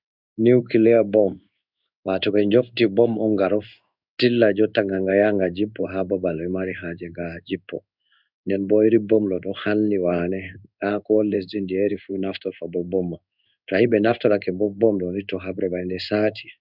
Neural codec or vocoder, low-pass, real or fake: codec, 16 kHz in and 24 kHz out, 1 kbps, XY-Tokenizer; 5.4 kHz; fake